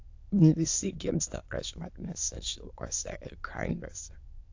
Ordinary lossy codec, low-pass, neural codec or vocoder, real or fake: AAC, 48 kbps; 7.2 kHz; autoencoder, 22.05 kHz, a latent of 192 numbers a frame, VITS, trained on many speakers; fake